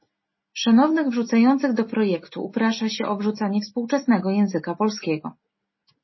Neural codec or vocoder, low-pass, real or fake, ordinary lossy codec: none; 7.2 kHz; real; MP3, 24 kbps